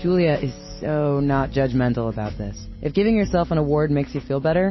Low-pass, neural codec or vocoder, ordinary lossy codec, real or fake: 7.2 kHz; none; MP3, 24 kbps; real